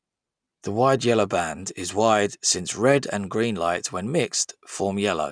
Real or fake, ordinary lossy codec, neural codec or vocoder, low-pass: real; none; none; 9.9 kHz